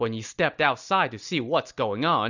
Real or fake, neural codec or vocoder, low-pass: real; none; 7.2 kHz